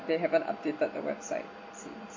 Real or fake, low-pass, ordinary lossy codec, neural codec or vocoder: real; 7.2 kHz; MP3, 32 kbps; none